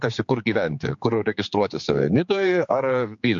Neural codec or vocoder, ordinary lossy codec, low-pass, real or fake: codec, 16 kHz, 4 kbps, X-Codec, HuBERT features, trained on general audio; MP3, 48 kbps; 7.2 kHz; fake